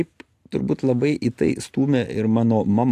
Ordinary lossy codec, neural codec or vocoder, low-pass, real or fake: AAC, 64 kbps; none; 14.4 kHz; real